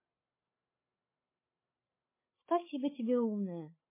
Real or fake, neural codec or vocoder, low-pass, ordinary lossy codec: fake; codec, 16 kHz, 16 kbps, FreqCodec, larger model; 3.6 kHz; MP3, 16 kbps